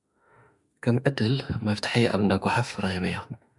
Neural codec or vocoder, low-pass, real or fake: autoencoder, 48 kHz, 32 numbers a frame, DAC-VAE, trained on Japanese speech; 10.8 kHz; fake